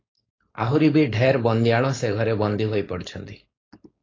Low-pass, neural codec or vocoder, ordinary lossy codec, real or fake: 7.2 kHz; codec, 16 kHz, 4.8 kbps, FACodec; AAC, 32 kbps; fake